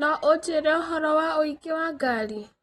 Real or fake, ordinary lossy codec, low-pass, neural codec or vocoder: real; AAC, 32 kbps; 19.8 kHz; none